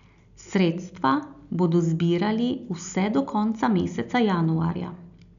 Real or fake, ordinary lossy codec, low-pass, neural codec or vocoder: real; none; 7.2 kHz; none